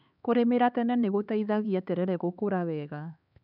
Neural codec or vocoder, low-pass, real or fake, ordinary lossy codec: codec, 16 kHz, 4 kbps, X-Codec, HuBERT features, trained on LibriSpeech; 5.4 kHz; fake; none